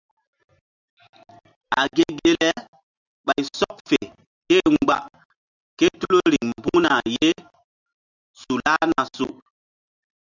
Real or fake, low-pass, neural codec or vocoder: real; 7.2 kHz; none